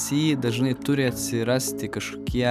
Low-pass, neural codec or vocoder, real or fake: 14.4 kHz; none; real